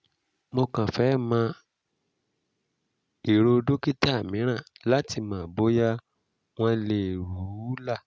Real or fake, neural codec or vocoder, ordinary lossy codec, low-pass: real; none; none; none